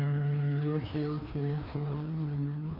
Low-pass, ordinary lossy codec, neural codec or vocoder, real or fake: 5.4 kHz; none; codec, 16 kHz, 2 kbps, FreqCodec, larger model; fake